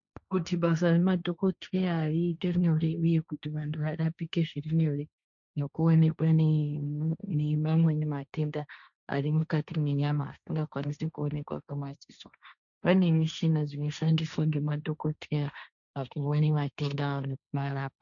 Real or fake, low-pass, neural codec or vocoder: fake; 7.2 kHz; codec, 16 kHz, 1.1 kbps, Voila-Tokenizer